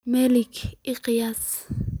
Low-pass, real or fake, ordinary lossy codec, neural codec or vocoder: none; real; none; none